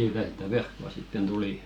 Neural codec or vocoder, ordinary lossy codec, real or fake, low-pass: none; none; real; 19.8 kHz